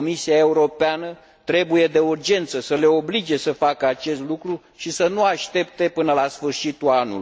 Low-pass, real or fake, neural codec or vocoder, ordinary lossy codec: none; real; none; none